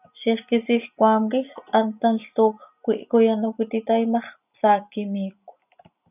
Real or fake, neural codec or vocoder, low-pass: real; none; 3.6 kHz